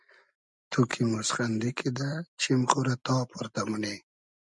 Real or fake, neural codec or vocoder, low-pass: real; none; 10.8 kHz